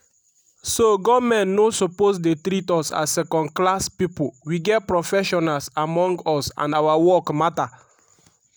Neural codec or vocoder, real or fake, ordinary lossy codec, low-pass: none; real; none; none